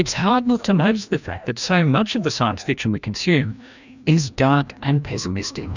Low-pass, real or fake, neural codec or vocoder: 7.2 kHz; fake; codec, 16 kHz, 1 kbps, FreqCodec, larger model